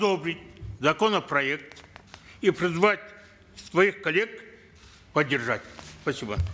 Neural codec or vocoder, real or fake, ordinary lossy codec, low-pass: none; real; none; none